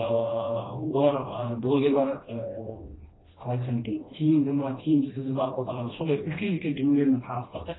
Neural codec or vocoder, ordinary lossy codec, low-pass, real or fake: codec, 16 kHz, 1 kbps, FreqCodec, smaller model; AAC, 16 kbps; 7.2 kHz; fake